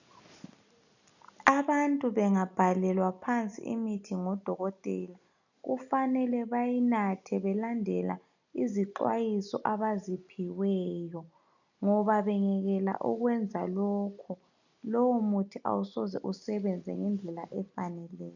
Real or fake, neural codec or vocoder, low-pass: real; none; 7.2 kHz